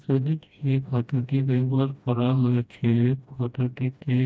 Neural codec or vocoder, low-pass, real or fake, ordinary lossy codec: codec, 16 kHz, 1 kbps, FreqCodec, smaller model; none; fake; none